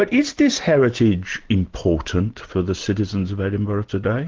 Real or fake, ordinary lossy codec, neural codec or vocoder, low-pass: real; Opus, 16 kbps; none; 7.2 kHz